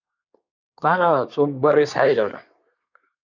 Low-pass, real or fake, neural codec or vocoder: 7.2 kHz; fake; codec, 24 kHz, 1 kbps, SNAC